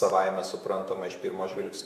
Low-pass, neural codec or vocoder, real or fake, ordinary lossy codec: 14.4 kHz; autoencoder, 48 kHz, 128 numbers a frame, DAC-VAE, trained on Japanese speech; fake; Opus, 64 kbps